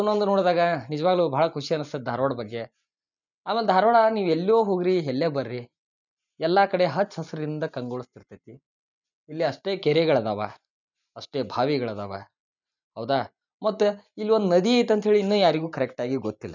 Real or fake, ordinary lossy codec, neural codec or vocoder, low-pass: real; none; none; 7.2 kHz